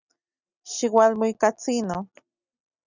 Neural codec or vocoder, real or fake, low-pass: none; real; 7.2 kHz